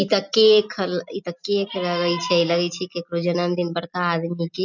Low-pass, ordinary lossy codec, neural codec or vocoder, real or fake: 7.2 kHz; none; none; real